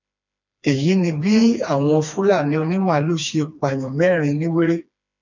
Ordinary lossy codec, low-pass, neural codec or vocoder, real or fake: none; 7.2 kHz; codec, 16 kHz, 2 kbps, FreqCodec, smaller model; fake